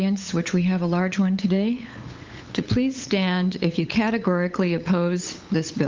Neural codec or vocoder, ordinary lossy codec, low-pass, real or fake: codec, 16 kHz, 8 kbps, FunCodec, trained on LibriTTS, 25 frames a second; Opus, 32 kbps; 7.2 kHz; fake